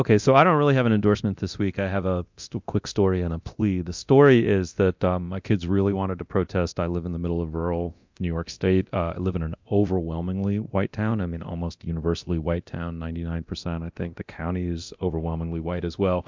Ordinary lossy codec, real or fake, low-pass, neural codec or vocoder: MP3, 64 kbps; fake; 7.2 kHz; codec, 24 kHz, 0.9 kbps, DualCodec